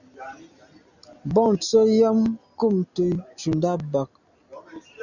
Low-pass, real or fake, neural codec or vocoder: 7.2 kHz; real; none